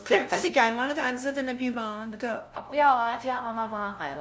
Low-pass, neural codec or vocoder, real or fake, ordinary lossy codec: none; codec, 16 kHz, 0.5 kbps, FunCodec, trained on LibriTTS, 25 frames a second; fake; none